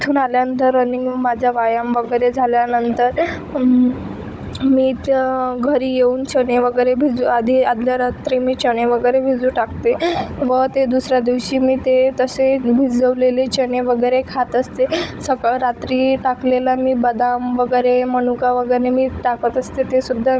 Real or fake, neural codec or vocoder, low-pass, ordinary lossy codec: fake; codec, 16 kHz, 16 kbps, FunCodec, trained on Chinese and English, 50 frames a second; none; none